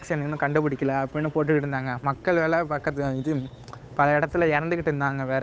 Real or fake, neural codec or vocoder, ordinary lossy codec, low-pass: fake; codec, 16 kHz, 8 kbps, FunCodec, trained on Chinese and English, 25 frames a second; none; none